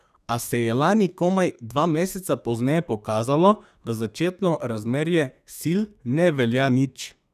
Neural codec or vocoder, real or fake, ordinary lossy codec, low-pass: codec, 32 kHz, 1.9 kbps, SNAC; fake; none; 14.4 kHz